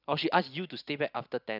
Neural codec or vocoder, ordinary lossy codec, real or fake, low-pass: none; none; real; 5.4 kHz